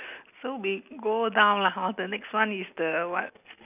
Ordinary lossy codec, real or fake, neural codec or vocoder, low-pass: none; real; none; 3.6 kHz